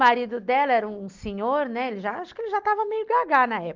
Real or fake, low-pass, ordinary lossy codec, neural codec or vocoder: real; 7.2 kHz; Opus, 32 kbps; none